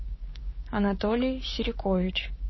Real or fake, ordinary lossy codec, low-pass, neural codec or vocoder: fake; MP3, 24 kbps; 7.2 kHz; codec, 16 kHz, 6 kbps, DAC